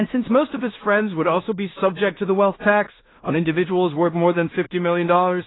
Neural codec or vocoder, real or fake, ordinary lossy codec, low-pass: codec, 16 kHz in and 24 kHz out, 0.4 kbps, LongCat-Audio-Codec, two codebook decoder; fake; AAC, 16 kbps; 7.2 kHz